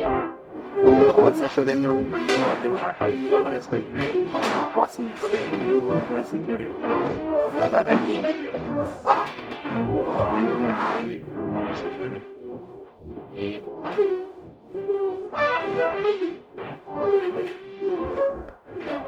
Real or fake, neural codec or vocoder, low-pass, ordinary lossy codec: fake; codec, 44.1 kHz, 0.9 kbps, DAC; 19.8 kHz; none